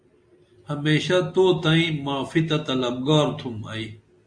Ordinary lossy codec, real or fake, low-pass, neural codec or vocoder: AAC, 64 kbps; real; 9.9 kHz; none